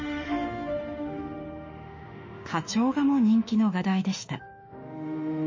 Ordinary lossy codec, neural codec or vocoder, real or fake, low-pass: MP3, 32 kbps; autoencoder, 48 kHz, 32 numbers a frame, DAC-VAE, trained on Japanese speech; fake; 7.2 kHz